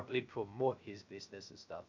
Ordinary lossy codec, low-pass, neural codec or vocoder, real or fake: none; 7.2 kHz; codec, 16 kHz, 0.3 kbps, FocalCodec; fake